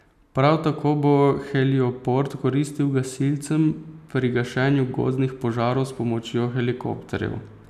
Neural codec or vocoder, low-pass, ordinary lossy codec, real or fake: none; 14.4 kHz; none; real